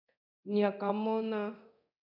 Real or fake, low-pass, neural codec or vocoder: fake; 5.4 kHz; codec, 24 kHz, 0.9 kbps, DualCodec